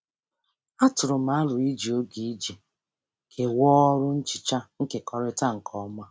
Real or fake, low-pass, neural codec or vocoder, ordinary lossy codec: real; none; none; none